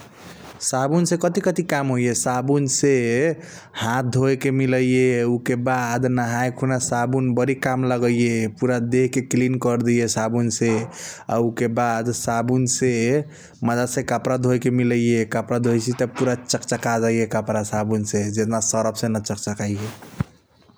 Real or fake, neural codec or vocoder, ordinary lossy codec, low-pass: real; none; none; none